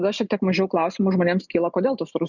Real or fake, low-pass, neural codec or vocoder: real; 7.2 kHz; none